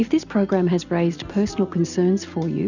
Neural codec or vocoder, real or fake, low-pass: none; real; 7.2 kHz